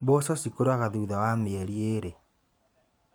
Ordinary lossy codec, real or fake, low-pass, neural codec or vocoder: none; fake; none; vocoder, 44.1 kHz, 128 mel bands every 512 samples, BigVGAN v2